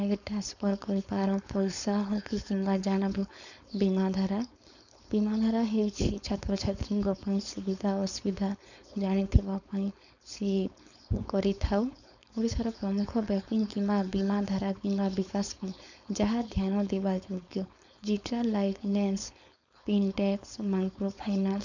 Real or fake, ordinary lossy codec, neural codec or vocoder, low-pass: fake; none; codec, 16 kHz, 4.8 kbps, FACodec; 7.2 kHz